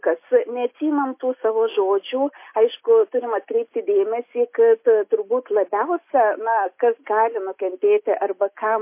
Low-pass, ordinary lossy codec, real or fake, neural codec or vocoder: 3.6 kHz; MP3, 24 kbps; real; none